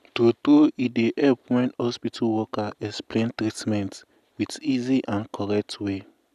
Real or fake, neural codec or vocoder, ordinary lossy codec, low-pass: real; none; none; 14.4 kHz